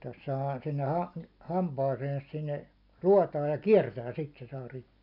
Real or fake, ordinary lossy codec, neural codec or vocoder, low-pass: real; none; none; 5.4 kHz